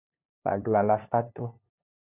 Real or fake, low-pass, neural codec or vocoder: fake; 3.6 kHz; codec, 16 kHz, 2 kbps, FunCodec, trained on LibriTTS, 25 frames a second